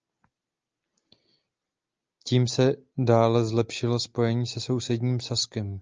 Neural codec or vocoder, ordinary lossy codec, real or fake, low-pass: none; Opus, 24 kbps; real; 7.2 kHz